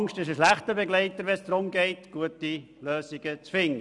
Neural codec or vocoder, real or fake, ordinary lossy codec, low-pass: none; real; none; 10.8 kHz